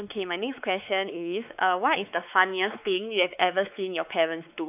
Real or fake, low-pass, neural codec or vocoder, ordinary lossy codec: fake; 3.6 kHz; codec, 16 kHz, 4 kbps, X-Codec, WavLM features, trained on Multilingual LibriSpeech; none